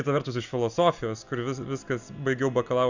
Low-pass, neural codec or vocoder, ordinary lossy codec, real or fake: 7.2 kHz; none; Opus, 64 kbps; real